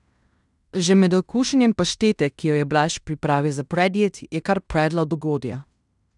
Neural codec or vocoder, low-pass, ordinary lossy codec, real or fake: codec, 16 kHz in and 24 kHz out, 0.9 kbps, LongCat-Audio-Codec, fine tuned four codebook decoder; 10.8 kHz; none; fake